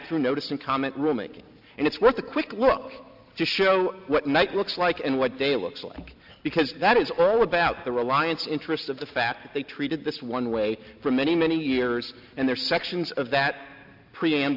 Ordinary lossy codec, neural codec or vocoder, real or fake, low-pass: AAC, 48 kbps; none; real; 5.4 kHz